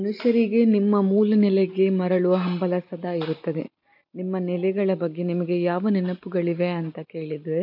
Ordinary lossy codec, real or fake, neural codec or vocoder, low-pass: none; real; none; 5.4 kHz